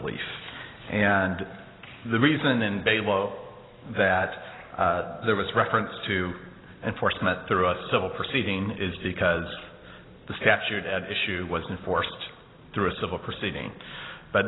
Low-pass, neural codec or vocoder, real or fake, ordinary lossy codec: 7.2 kHz; none; real; AAC, 16 kbps